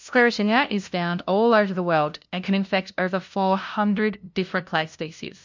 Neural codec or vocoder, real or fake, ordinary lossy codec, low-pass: codec, 16 kHz, 0.5 kbps, FunCodec, trained on LibriTTS, 25 frames a second; fake; MP3, 64 kbps; 7.2 kHz